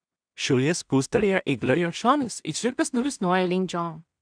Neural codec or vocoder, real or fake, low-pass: codec, 16 kHz in and 24 kHz out, 0.4 kbps, LongCat-Audio-Codec, two codebook decoder; fake; 9.9 kHz